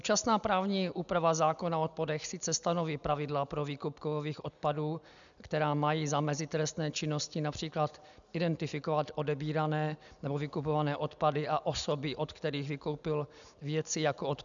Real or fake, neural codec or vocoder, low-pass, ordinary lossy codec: real; none; 7.2 kHz; MP3, 96 kbps